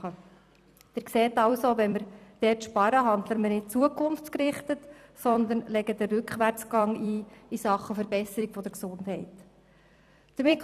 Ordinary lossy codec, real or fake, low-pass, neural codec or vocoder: none; fake; 14.4 kHz; vocoder, 44.1 kHz, 128 mel bands every 256 samples, BigVGAN v2